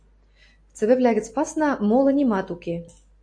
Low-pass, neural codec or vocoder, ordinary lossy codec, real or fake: 9.9 kHz; none; AAC, 48 kbps; real